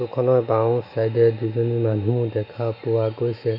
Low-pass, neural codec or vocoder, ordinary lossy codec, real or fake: 5.4 kHz; none; AAC, 48 kbps; real